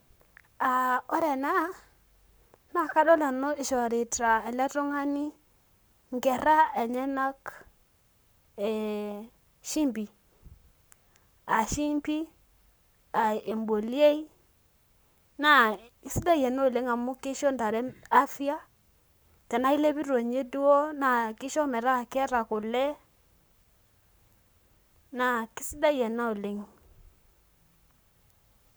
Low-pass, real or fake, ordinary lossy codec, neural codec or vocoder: none; fake; none; codec, 44.1 kHz, 7.8 kbps, Pupu-Codec